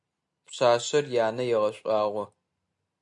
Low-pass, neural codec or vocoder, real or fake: 10.8 kHz; none; real